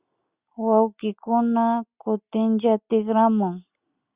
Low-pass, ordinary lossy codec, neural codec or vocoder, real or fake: 3.6 kHz; Opus, 64 kbps; none; real